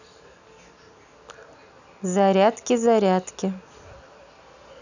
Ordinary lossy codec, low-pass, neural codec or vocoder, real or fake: none; 7.2 kHz; none; real